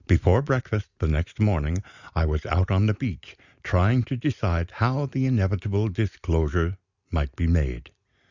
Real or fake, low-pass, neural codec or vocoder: real; 7.2 kHz; none